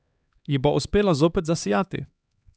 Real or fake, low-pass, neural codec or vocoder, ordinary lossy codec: fake; none; codec, 16 kHz, 2 kbps, X-Codec, HuBERT features, trained on LibriSpeech; none